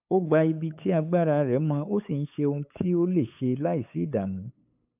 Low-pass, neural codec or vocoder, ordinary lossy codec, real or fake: 3.6 kHz; codec, 16 kHz, 8 kbps, FunCodec, trained on LibriTTS, 25 frames a second; AAC, 32 kbps; fake